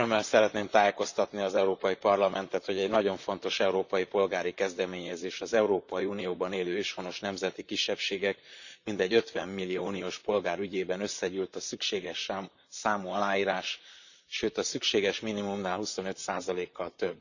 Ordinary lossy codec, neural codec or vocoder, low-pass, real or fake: none; vocoder, 44.1 kHz, 128 mel bands, Pupu-Vocoder; 7.2 kHz; fake